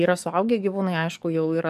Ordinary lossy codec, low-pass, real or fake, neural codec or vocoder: MP3, 96 kbps; 14.4 kHz; fake; codec, 44.1 kHz, 7.8 kbps, DAC